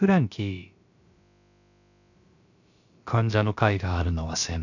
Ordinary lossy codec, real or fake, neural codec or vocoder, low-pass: none; fake; codec, 16 kHz, about 1 kbps, DyCAST, with the encoder's durations; 7.2 kHz